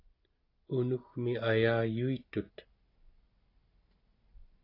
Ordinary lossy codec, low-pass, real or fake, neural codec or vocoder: AAC, 32 kbps; 5.4 kHz; real; none